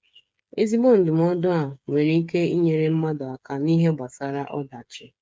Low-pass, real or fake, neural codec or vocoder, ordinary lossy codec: none; fake; codec, 16 kHz, 8 kbps, FreqCodec, smaller model; none